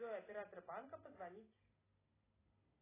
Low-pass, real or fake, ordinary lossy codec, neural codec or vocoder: 3.6 kHz; real; AAC, 16 kbps; none